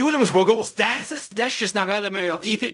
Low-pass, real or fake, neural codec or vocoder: 10.8 kHz; fake; codec, 16 kHz in and 24 kHz out, 0.4 kbps, LongCat-Audio-Codec, fine tuned four codebook decoder